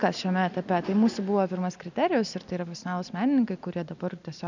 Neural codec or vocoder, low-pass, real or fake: none; 7.2 kHz; real